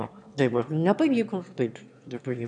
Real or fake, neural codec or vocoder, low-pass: fake; autoencoder, 22.05 kHz, a latent of 192 numbers a frame, VITS, trained on one speaker; 9.9 kHz